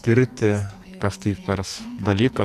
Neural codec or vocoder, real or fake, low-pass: codec, 44.1 kHz, 2.6 kbps, SNAC; fake; 14.4 kHz